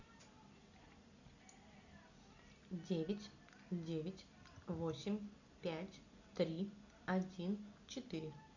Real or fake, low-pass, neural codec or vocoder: real; 7.2 kHz; none